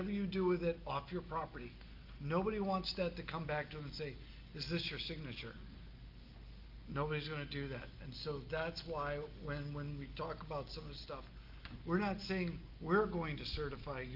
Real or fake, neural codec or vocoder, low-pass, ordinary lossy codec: real; none; 5.4 kHz; Opus, 24 kbps